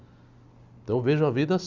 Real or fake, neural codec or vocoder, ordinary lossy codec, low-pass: real; none; Opus, 64 kbps; 7.2 kHz